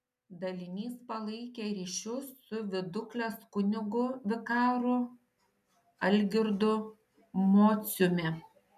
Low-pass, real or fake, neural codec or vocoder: 14.4 kHz; real; none